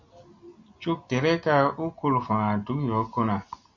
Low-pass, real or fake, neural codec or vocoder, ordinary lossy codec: 7.2 kHz; real; none; MP3, 48 kbps